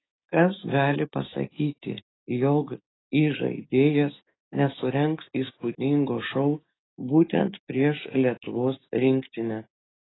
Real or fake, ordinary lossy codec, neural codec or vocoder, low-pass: fake; AAC, 16 kbps; codec, 16 kHz, 6 kbps, DAC; 7.2 kHz